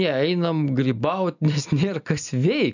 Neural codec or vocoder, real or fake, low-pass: none; real; 7.2 kHz